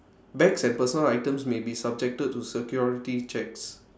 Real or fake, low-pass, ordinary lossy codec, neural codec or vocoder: real; none; none; none